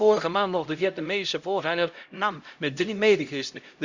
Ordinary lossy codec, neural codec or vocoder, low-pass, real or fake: Opus, 64 kbps; codec, 16 kHz, 0.5 kbps, X-Codec, HuBERT features, trained on LibriSpeech; 7.2 kHz; fake